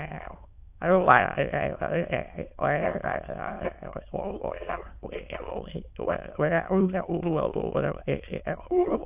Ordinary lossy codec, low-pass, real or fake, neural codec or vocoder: AAC, 32 kbps; 3.6 kHz; fake; autoencoder, 22.05 kHz, a latent of 192 numbers a frame, VITS, trained on many speakers